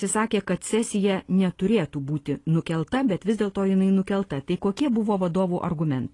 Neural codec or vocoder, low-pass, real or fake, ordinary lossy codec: none; 10.8 kHz; real; AAC, 32 kbps